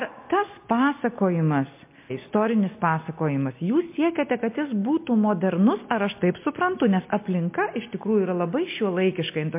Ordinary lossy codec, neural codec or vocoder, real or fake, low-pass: MP3, 24 kbps; none; real; 3.6 kHz